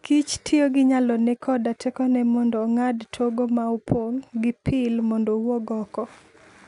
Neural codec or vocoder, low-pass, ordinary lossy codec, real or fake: none; 10.8 kHz; none; real